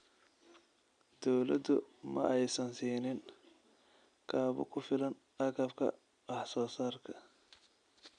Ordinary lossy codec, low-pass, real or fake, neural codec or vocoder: none; 9.9 kHz; real; none